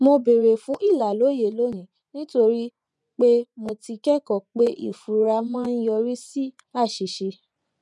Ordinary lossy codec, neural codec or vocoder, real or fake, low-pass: none; vocoder, 24 kHz, 100 mel bands, Vocos; fake; none